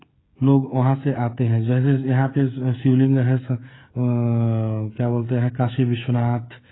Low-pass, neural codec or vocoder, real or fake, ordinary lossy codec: 7.2 kHz; codec, 16 kHz, 16 kbps, FreqCodec, smaller model; fake; AAC, 16 kbps